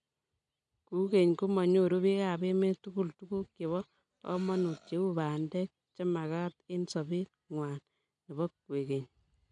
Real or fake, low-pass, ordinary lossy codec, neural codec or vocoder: real; 9.9 kHz; MP3, 96 kbps; none